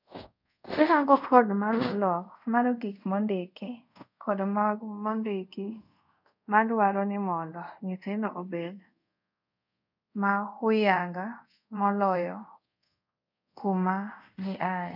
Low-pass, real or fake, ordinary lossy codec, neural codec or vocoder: 5.4 kHz; fake; none; codec, 24 kHz, 0.5 kbps, DualCodec